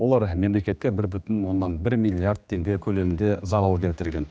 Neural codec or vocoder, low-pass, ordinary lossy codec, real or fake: codec, 16 kHz, 2 kbps, X-Codec, HuBERT features, trained on general audio; none; none; fake